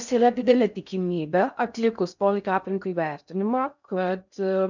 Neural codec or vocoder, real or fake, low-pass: codec, 16 kHz in and 24 kHz out, 0.6 kbps, FocalCodec, streaming, 4096 codes; fake; 7.2 kHz